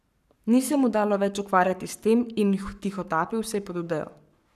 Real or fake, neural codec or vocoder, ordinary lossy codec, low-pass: fake; codec, 44.1 kHz, 7.8 kbps, Pupu-Codec; none; 14.4 kHz